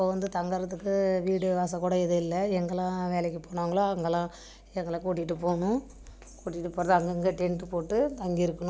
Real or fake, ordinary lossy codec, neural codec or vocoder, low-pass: real; none; none; none